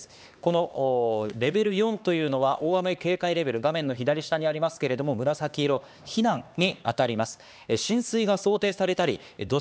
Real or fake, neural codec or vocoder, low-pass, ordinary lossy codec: fake; codec, 16 kHz, 2 kbps, X-Codec, HuBERT features, trained on LibriSpeech; none; none